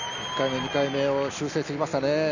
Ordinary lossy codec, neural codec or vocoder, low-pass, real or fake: none; none; 7.2 kHz; real